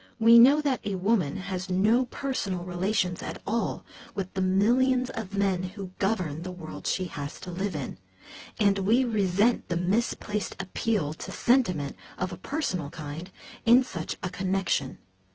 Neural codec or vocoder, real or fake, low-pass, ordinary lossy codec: vocoder, 24 kHz, 100 mel bands, Vocos; fake; 7.2 kHz; Opus, 16 kbps